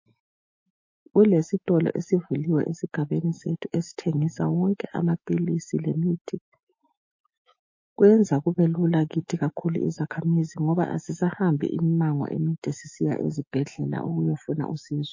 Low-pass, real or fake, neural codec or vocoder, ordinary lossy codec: 7.2 kHz; fake; autoencoder, 48 kHz, 128 numbers a frame, DAC-VAE, trained on Japanese speech; MP3, 32 kbps